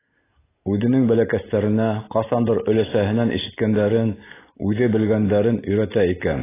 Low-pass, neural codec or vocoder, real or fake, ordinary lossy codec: 3.6 kHz; none; real; AAC, 16 kbps